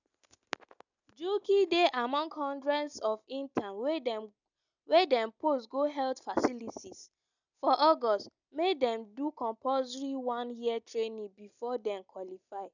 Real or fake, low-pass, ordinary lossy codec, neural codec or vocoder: real; 7.2 kHz; none; none